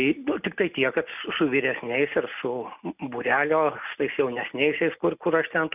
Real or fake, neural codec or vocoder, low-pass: real; none; 3.6 kHz